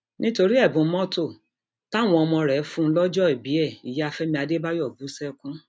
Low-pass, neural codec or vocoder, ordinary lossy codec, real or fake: none; none; none; real